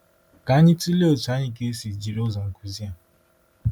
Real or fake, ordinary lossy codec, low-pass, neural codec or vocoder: real; none; 19.8 kHz; none